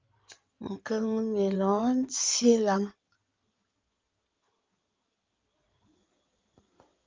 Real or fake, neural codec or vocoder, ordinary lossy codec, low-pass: fake; codec, 16 kHz in and 24 kHz out, 2.2 kbps, FireRedTTS-2 codec; Opus, 24 kbps; 7.2 kHz